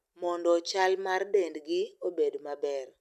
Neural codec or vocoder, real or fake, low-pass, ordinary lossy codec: none; real; 14.4 kHz; none